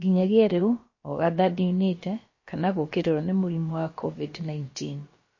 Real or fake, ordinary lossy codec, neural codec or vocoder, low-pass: fake; MP3, 32 kbps; codec, 16 kHz, about 1 kbps, DyCAST, with the encoder's durations; 7.2 kHz